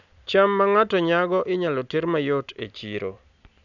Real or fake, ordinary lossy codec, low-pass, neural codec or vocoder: real; none; 7.2 kHz; none